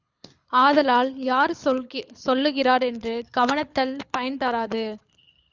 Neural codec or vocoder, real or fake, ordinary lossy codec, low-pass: codec, 24 kHz, 6 kbps, HILCodec; fake; Opus, 64 kbps; 7.2 kHz